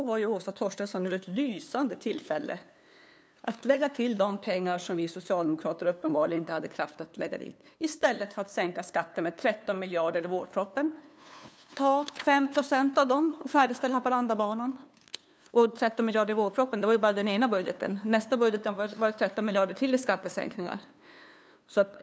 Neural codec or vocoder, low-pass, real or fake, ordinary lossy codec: codec, 16 kHz, 2 kbps, FunCodec, trained on LibriTTS, 25 frames a second; none; fake; none